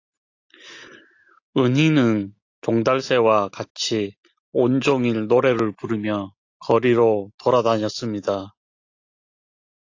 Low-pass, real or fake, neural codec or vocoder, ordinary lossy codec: 7.2 kHz; real; none; AAC, 48 kbps